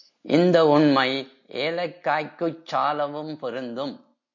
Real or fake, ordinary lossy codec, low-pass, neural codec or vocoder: real; MP3, 48 kbps; 7.2 kHz; none